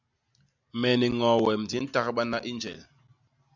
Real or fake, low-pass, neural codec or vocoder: real; 7.2 kHz; none